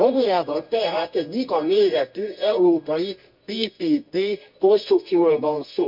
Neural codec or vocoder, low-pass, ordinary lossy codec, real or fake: codec, 24 kHz, 0.9 kbps, WavTokenizer, medium music audio release; 5.4 kHz; MP3, 48 kbps; fake